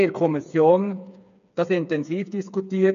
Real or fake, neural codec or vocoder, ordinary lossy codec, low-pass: fake; codec, 16 kHz, 4 kbps, FreqCodec, smaller model; none; 7.2 kHz